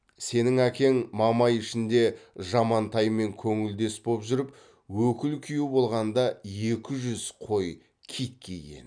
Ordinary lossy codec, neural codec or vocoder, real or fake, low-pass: none; none; real; 9.9 kHz